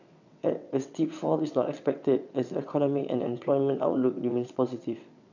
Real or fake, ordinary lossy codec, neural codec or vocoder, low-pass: fake; none; vocoder, 22.05 kHz, 80 mel bands, WaveNeXt; 7.2 kHz